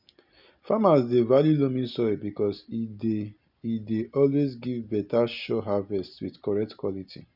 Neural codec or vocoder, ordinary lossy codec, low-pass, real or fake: none; none; 5.4 kHz; real